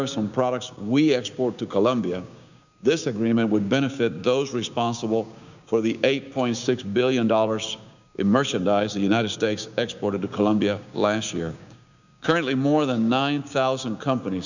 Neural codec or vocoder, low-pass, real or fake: codec, 16 kHz, 6 kbps, DAC; 7.2 kHz; fake